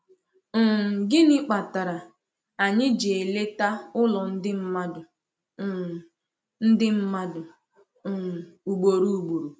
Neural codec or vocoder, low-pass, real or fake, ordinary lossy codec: none; none; real; none